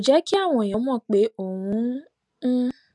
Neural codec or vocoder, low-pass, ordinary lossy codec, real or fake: none; 10.8 kHz; none; real